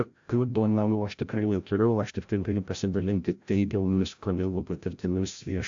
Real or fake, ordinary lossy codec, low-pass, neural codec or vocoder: fake; AAC, 64 kbps; 7.2 kHz; codec, 16 kHz, 0.5 kbps, FreqCodec, larger model